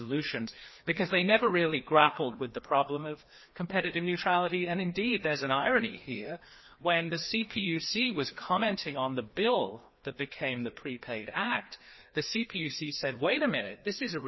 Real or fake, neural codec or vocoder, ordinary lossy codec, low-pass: fake; codec, 16 kHz, 2 kbps, FreqCodec, larger model; MP3, 24 kbps; 7.2 kHz